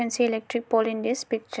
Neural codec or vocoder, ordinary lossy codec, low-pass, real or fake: none; none; none; real